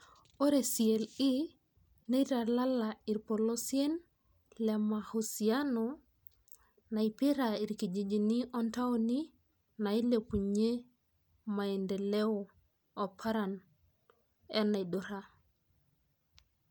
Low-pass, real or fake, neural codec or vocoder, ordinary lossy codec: none; real; none; none